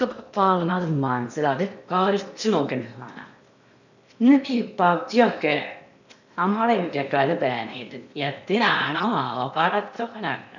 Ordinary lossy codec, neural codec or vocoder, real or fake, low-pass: none; codec, 16 kHz in and 24 kHz out, 0.8 kbps, FocalCodec, streaming, 65536 codes; fake; 7.2 kHz